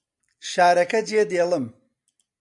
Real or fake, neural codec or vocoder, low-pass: real; none; 10.8 kHz